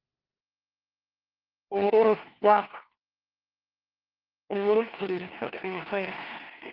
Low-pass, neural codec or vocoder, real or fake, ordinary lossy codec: 5.4 kHz; codec, 16 kHz, 1 kbps, FunCodec, trained on LibriTTS, 50 frames a second; fake; Opus, 16 kbps